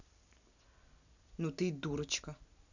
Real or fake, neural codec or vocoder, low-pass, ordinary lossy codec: real; none; 7.2 kHz; none